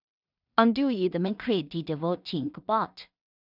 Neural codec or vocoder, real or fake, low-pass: codec, 16 kHz in and 24 kHz out, 0.4 kbps, LongCat-Audio-Codec, two codebook decoder; fake; 5.4 kHz